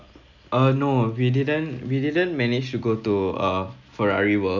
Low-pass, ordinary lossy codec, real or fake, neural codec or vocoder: 7.2 kHz; none; real; none